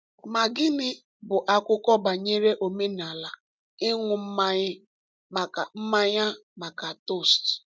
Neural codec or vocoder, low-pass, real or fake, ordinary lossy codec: none; none; real; none